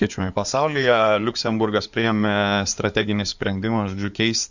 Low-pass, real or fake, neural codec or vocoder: 7.2 kHz; fake; codec, 16 kHz in and 24 kHz out, 2.2 kbps, FireRedTTS-2 codec